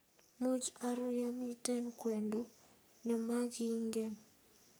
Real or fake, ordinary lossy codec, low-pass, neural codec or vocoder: fake; none; none; codec, 44.1 kHz, 3.4 kbps, Pupu-Codec